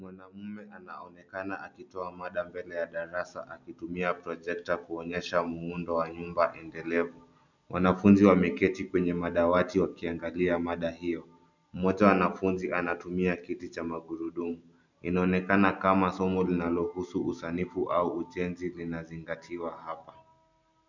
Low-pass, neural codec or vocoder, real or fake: 7.2 kHz; none; real